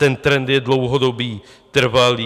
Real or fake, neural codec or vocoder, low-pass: real; none; 14.4 kHz